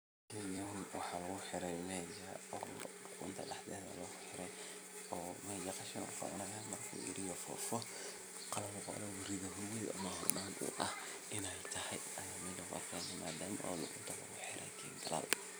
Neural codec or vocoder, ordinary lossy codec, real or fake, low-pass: vocoder, 44.1 kHz, 128 mel bands every 256 samples, BigVGAN v2; none; fake; none